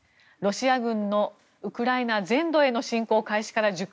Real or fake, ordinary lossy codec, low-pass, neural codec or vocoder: real; none; none; none